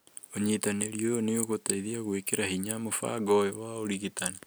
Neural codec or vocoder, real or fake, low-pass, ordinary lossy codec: none; real; none; none